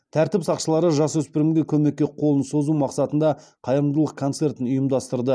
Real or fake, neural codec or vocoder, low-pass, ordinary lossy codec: real; none; none; none